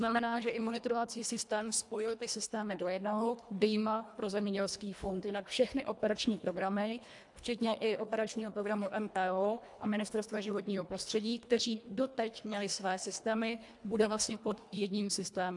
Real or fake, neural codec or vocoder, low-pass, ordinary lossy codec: fake; codec, 24 kHz, 1.5 kbps, HILCodec; 10.8 kHz; AAC, 64 kbps